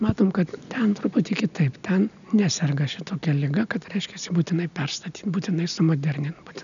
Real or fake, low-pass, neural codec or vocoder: real; 7.2 kHz; none